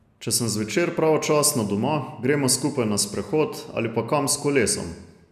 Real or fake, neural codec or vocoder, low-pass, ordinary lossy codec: real; none; 14.4 kHz; none